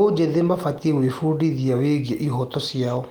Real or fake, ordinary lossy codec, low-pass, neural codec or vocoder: real; Opus, 24 kbps; 19.8 kHz; none